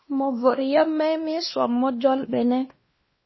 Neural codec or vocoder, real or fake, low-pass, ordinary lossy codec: codec, 16 kHz, 1 kbps, X-Codec, WavLM features, trained on Multilingual LibriSpeech; fake; 7.2 kHz; MP3, 24 kbps